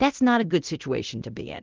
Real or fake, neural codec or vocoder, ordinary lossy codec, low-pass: fake; codec, 24 kHz, 0.9 kbps, DualCodec; Opus, 16 kbps; 7.2 kHz